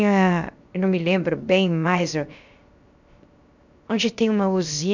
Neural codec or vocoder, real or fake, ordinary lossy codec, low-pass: codec, 16 kHz, 0.7 kbps, FocalCodec; fake; none; 7.2 kHz